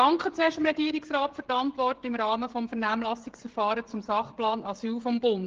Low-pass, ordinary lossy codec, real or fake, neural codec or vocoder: 7.2 kHz; Opus, 16 kbps; fake; codec, 16 kHz, 16 kbps, FreqCodec, smaller model